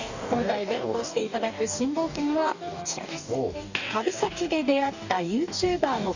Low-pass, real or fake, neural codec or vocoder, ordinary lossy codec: 7.2 kHz; fake; codec, 44.1 kHz, 2.6 kbps, DAC; none